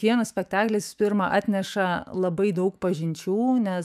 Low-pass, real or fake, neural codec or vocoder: 14.4 kHz; fake; autoencoder, 48 kHz, 128 numbers a frame, DAC-VAE, trained on Japanese speech